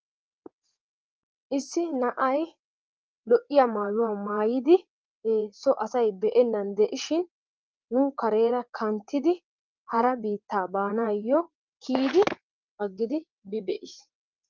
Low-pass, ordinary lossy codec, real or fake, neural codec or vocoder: 7.2 kHz; Opus, 24 kbps; fake; vocoder, 22.05 kHz, 80 mel bands, WaveNeXt